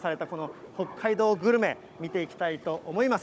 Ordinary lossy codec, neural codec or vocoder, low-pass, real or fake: none; codec, 16 kHz, 16 kbps, FunCodec, trained on Chinese and English, 50 frames a second; none; fake